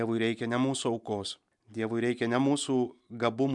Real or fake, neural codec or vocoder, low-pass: real; none; 10.8 kHz